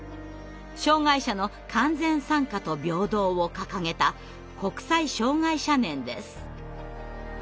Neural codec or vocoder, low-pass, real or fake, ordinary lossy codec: none; none; real; none